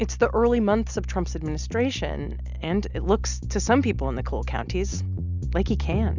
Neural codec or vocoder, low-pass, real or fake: none; 7.2 kHz; real